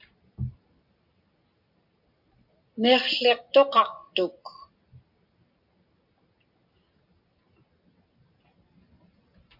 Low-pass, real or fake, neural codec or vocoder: 5.4 kHz; real; none